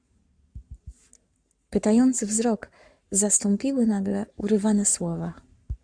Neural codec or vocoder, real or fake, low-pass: codec, 44.1 kHz, 7.8 kbps, Pupu-Codec; fake; 9.9 kHz